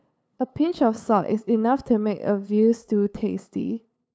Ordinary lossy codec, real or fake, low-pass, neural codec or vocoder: none; fake; none; codec, 16 kHz, 8 kbps, FunCodec, trained on LibriTTS, 25 frames a second